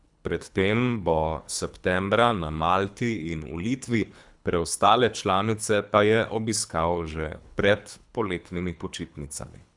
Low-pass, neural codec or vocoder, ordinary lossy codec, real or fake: 10.8 kHz; codec, 24 kHz, 3 kbps, HILCodec; none; fake